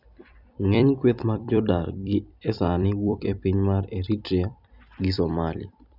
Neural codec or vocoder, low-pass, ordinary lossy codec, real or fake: vocoder, 44.1 kHz, 128 mel bands every 256 samples, BigVGAN v2; 5.4 kHz; none; fake